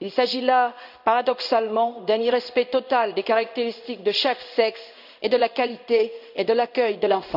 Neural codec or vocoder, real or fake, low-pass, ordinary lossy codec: codec, 16 kHz in and 24 kHz out, 1 kbps, XY-Tokenizer; fake; 5.4 kHz; none